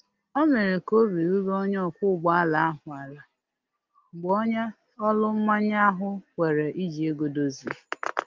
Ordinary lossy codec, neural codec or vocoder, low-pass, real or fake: Opus, 32 kbps; none; 7.2 kHz; real